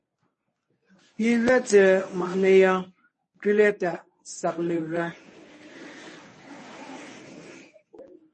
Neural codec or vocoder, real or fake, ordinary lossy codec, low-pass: codec, 24 kHz, 0.9 kbps, WavTokenizer, medium speech release version 1; fake; MP3, 32 kbps; 10.8 kHz